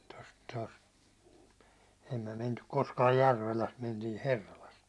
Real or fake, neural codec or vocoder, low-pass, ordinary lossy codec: real; none; none; none